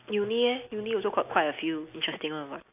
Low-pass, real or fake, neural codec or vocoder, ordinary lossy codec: 3.6 kHz; real; none; AAC, 32 kbps